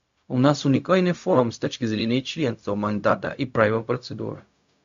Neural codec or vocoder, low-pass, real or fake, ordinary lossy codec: codec, 16 kHz, 0.4 kbps, LongCat-Audio-Codec; 7.2 kHz; fake; MP3, 48 kbps